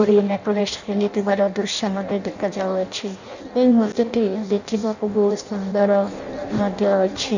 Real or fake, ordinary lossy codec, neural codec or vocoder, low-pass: fake; none; codec, 16 kHz in and 24 kHz out, 0.6 kbps, FireRedTTS-2 codec; 7.2 kHz